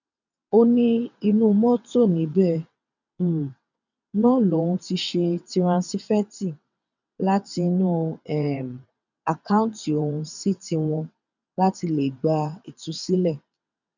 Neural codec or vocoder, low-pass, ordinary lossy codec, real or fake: vocoder, 22.05 kHz, 80 mel bands, WaveNeXt; 7.2 kHz; none; fake